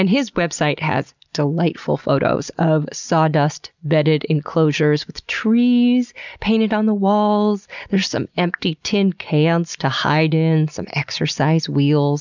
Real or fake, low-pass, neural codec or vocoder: real; 7.2 kHz; none